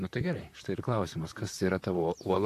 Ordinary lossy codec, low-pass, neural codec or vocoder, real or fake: AAC, 64 kbps; 14.4 kHz; vocoder, 44.1 kHz, 128 mel bands, Pupu-Vocoder; fake